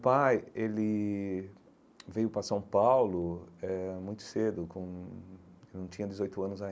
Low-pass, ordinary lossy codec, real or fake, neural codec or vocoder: none; none; real; none